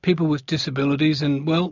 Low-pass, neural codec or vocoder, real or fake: 7.2 kHz; none; real